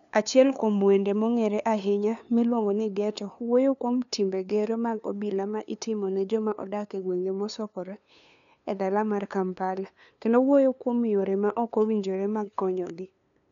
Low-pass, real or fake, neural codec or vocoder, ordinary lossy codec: 7.2 kHz; fake; codec, 16 kHz, 2 kbps, FunCodec, trained on LibriTTS, 25 frames a second; none